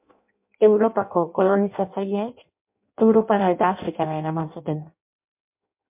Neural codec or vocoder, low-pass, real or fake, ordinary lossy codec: codec, 16 kHz in and 24 kHz out, 0.6 kbps, FireRedTTS-2 codec; 3.6 kHz; fake; MP3, 32 kbps